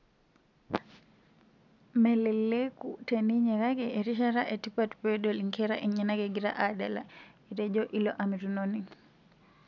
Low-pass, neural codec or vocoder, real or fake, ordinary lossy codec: 7.2 kHz; none; real; none